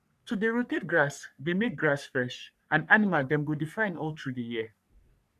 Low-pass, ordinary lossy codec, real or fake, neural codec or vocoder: 14.4 kHz; none; fake; codec, 44.1 kHz, 3.4 kbps, Pupu-Codec